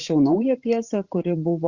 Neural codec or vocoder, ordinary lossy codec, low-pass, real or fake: vocoder, 44.1 kHz, 128 mel bands every 512 samples, BigVGAN v2; Opus, 64 kbps; 7.2 kHz; fake